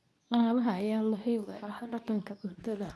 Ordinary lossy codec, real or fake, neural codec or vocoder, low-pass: none; fake; codec, 24 kHz, 0.9 kbps, WavTokenizer, medium speech release version 2; none